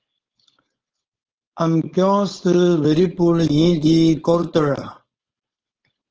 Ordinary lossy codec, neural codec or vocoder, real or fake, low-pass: Opus, 16 kbps; codec, 16 kHz, 4.8 kbps, FACodec; fake; 7.2 kHz